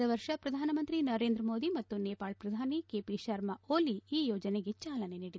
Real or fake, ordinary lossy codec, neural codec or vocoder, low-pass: real; none; none; none